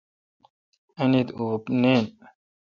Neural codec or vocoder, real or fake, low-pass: none; real; 7.2 kHz